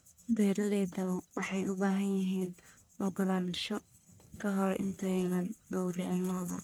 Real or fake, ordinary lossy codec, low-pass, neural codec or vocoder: fake; none; none; codec, 44.1 kHz, 1.7 kbps, Pupu-Codec